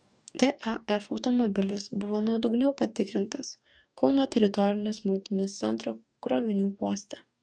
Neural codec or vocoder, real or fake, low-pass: codec, 44.1 kHz, 2.6 kbps, DAC; fake; 9.9 kHz